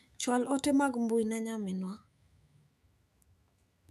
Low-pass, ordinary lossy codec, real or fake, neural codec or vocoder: 14.4 kHz; none; fake; autoencoder, 48 kHz, 128 numbers a frame, DAC-VAE, trained on Japanese speech